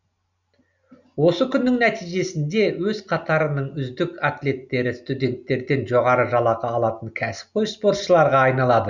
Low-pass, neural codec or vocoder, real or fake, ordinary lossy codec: 7.2 kHz; none; real; none